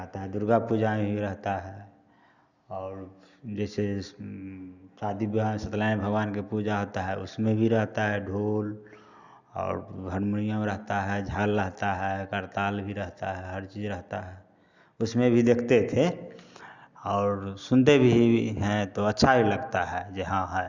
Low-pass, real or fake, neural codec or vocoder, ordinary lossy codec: 7.2 kHz; real; none; Opus, 64 kbps